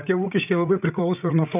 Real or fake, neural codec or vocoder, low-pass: fake; codec, 16 kHz, 8 kbps, FreqCodec, larger model; 3.6 kHz